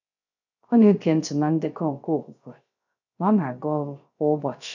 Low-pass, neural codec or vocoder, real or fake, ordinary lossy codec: 7.2 kHz; codec, 16 kHz, 0.3 kbps, FocalCodec; fake; none